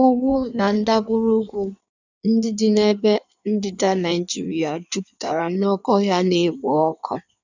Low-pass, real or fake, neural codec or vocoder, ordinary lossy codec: 7.2 kHz; fake; codec, 16 kHz in and 24 kHz out, 1.1 kbps, FireRedTTS-2 codec; none